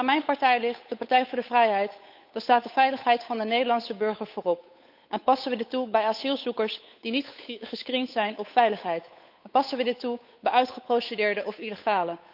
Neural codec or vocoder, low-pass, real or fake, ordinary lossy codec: codec, 16 kHz, 8 kbps, FunCodec, trained on Chinese and English, 25 frames a second; 5.4 kHz; fake; none